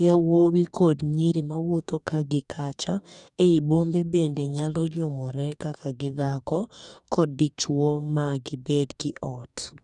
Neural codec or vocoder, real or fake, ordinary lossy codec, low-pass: codec, 44.1 kHz, 2.6 kbps, DAC; fake; none; 10.8 kHz